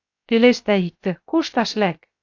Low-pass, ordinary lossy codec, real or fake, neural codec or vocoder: 7.2 kHz; AAC, 48 kbps; fake; codec, 16 kHz, 0.7 kbps, FocalCodec